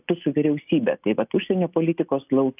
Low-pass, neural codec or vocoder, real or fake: 3.6 kHz; none; real